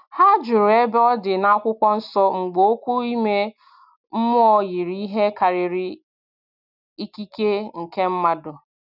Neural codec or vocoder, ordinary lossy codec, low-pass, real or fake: none; none; 5.4 kHz; real